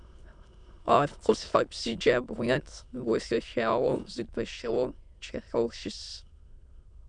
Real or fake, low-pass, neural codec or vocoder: fake; 9.9 kHz; autoencoder, 22.05 kHz, a latent of 192 numbers a frame, VITS, trained on many speakers